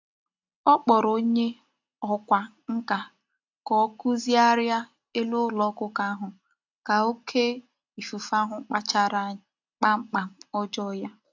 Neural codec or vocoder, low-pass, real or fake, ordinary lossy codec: none; 7.2 kHz; real; AAC, 48 kbps